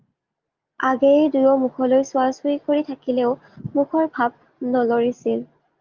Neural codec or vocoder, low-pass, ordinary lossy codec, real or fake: none; 7.2 kHz; Opus, 32 kbps; real